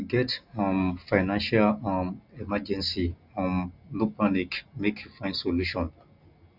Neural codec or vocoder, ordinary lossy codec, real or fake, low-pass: none; none; real; 5.4 kHz